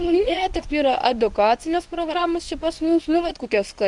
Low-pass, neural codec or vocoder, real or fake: 10.8 kHz; codec, 24 kHz, 0.9 kbps, WavTokenizer, medium speech release version 1; fake